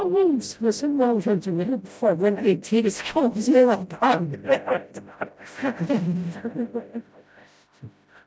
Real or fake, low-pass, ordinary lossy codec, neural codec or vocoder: fake; none; none; codec, 16 kHz, 0.5 kbps, FreqCodec, smaller model